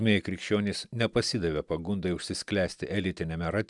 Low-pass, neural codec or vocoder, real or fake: 10.8 kHz; none; real